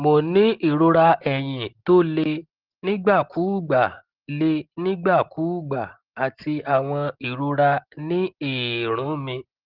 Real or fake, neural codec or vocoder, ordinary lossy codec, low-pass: real; none; Opus, 16 kbps; 5.4 kHz